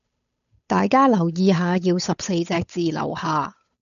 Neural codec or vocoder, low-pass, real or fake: codec, 16 kHz, 8 kbps, FunCodec, trained on Chinese and English, 25 frames a second; 7.2 kHz; fake